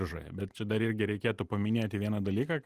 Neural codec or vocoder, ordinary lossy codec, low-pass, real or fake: none; Opus, 24 kbps; 14.4 kHz; real